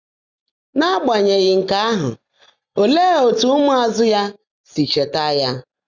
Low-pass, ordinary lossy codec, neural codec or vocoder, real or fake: 7.2 kHz; Opus, 64 kbps; none; real